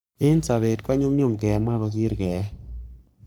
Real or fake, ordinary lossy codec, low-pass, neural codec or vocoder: fake; none; none; codec, 44.1 kHz, 3.4 kbps, Pupu-Codec